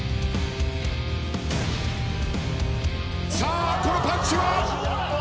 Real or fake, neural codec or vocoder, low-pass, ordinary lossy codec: real; none; none; none